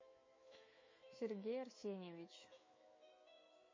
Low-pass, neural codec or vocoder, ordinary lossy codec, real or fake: 7.2 kHz; autoencoder, 48 kHz, 128 numbers a frame, DAC-VAE, trained on Japanese speech; MP3, 32 kbps; fake